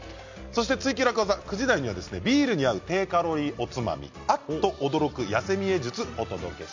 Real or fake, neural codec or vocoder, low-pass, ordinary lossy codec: real; none; 7.2 kHz; MP3, 48 kbps